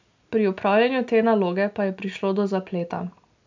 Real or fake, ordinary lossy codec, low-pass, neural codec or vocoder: real; MP3, 64 kbps; 7.2 kHz; none